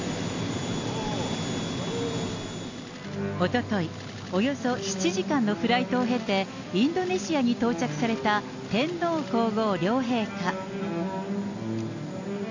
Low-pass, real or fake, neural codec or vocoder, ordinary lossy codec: 7.2 kHz; real; none; AAC, 48 kbps